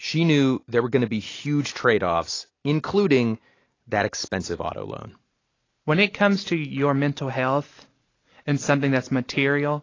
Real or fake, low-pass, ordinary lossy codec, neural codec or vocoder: real; 7.2 kHz; AAC, 32 kbps; none